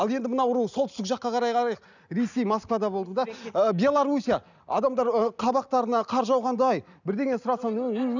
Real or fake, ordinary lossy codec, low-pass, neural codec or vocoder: real; none; 7.2 kHz; none